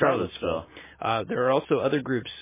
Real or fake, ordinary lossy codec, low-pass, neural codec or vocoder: real; MP3, 16 kbps; 3.6 kHz; none